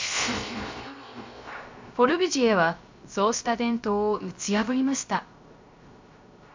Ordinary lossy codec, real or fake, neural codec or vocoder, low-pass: MP3, 64 kbps; fake; codec, 16 kHz, 0.3 kbps, FocalCodec; 7.2 kHz